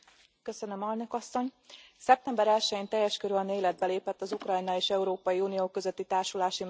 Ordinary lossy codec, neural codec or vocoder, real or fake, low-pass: none; none; real; none